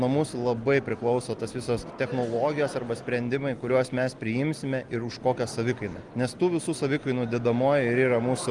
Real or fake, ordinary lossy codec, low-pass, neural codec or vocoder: real; Opus, 24 kbps; 10.8 kHz; none